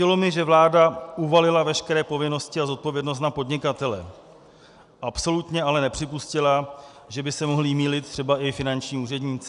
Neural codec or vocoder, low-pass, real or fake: none; 10.8 kHz; real